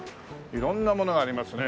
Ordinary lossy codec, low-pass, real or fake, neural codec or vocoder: none; none; real; none